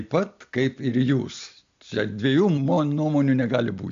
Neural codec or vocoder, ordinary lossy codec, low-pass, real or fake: none; AAC, 64 kbps; 7.2 kHz; real